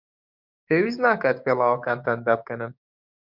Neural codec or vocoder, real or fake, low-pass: codec, 16 kHz, 6 kbps, DAC; fake; 5.4 kHz